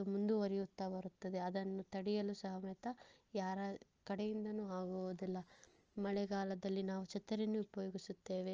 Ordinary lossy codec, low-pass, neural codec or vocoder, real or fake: Opus, 24 kbps; 7.2 kHz; none; real